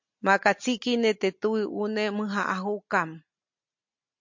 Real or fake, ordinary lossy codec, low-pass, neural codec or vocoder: real; MP3, 48 kbps; 7.2 kHz; none